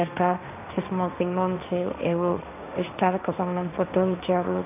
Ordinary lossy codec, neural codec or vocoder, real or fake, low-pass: none; codec, 16 kHz, 1.1 kbps, Voila-Tokenizer; fake; 3.6 kHz